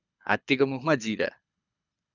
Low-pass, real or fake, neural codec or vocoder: 7.2 kHz; fake; codec, 24 kHz, 6 kbps, HILCodec